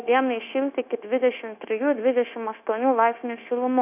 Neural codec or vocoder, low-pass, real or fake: codec, 16 kHz, 0.9 kbps, LongCat-Audio-Codec; 3.6 kHz; fake